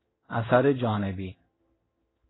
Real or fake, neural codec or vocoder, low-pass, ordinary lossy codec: fake; codec, 16 kHz in and 24 kHz out, 1 kbps, XY-Tokenizer; 7.2 kHz; AAC, 16 kbps